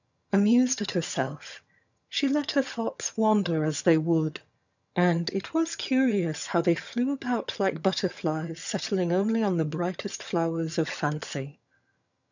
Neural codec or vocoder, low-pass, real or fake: vocoder, 22.05 kHz, 80 mel bands, HiFi-GAN; 7.2 kHz; fake